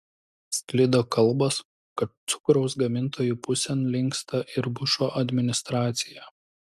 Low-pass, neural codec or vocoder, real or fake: 14.4 kHz; none; real